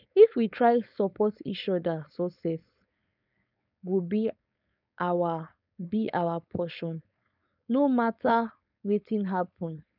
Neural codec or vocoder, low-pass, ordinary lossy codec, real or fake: codec, 16 kHz, 4.8 kbps, FACodec; 5.4 kHz; none; fake